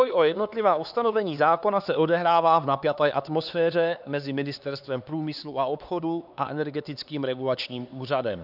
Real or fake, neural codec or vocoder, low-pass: fake; codec, 16 kHz, 4 kbps, X-Codec, HuBERT features, trained on LibriSpeech; 5.4 kHz